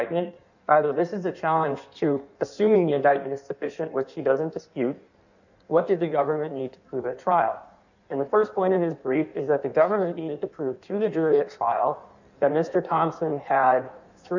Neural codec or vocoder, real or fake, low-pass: codec, 16 kHz in and 24 kHz out, 1.1 kbps, FireRedTTS-2 codec; fake; 7.2 kHz